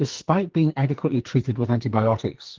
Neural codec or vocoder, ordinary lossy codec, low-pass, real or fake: codec, 44.1 kHz, 2.6 kbps, SNAC; Opus, 16 kbps; 7.2 kHz; fake